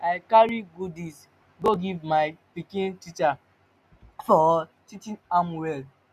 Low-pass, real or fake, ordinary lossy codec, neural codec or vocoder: 14.4 kHz; real; none; none